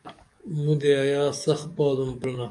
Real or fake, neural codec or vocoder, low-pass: fake; codec, 44.1 kHz, 7.8 kbps, DAC; 10.8 kHz